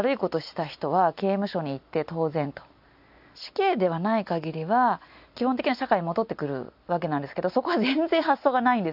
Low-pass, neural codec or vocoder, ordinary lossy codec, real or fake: 5.4 kHz; none; AAC, 48 kbps; real